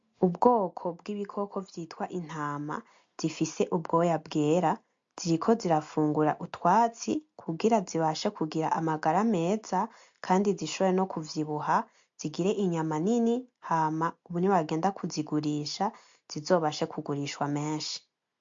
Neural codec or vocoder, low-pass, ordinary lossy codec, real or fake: none; 7.2 kHz; MP3, 48 kbps; real